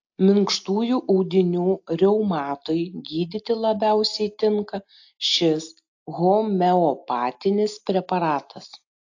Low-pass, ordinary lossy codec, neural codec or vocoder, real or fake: 7.2 kHz; AAC, 48 kbps; none; real